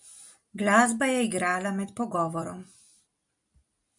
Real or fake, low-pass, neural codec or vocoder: real; 10.8 kHz; none